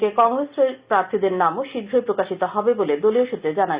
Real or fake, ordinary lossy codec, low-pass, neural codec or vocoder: real; Opus, 64 kbps; 3.6 kHz; none